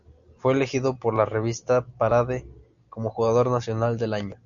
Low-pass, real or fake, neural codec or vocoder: 7.2 kHz; real; none